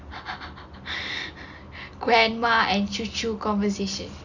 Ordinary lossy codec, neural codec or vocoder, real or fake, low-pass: none; none; real; 7.2 kHz